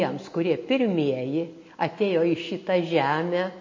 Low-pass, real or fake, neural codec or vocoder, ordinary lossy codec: 7.2 kHz; real; none; MP3, 32 kbps